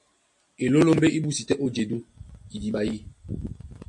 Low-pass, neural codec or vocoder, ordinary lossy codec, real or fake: 10.8 kHz; none; MP3, 48 kbps; real